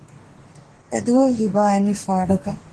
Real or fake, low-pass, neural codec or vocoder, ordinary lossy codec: fake; 10.8 kHz; codec, 44.1 kHz, 2.6 kbps, DAC; Opus, 16 kbps